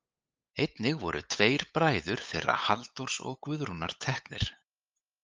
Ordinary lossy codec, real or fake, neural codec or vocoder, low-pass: Opus, 24 kbps; fake; codec, 16 kHz, 8 kbps, FunCodec, trained on LibriTTS, 25 frames a second; 7.2 kHz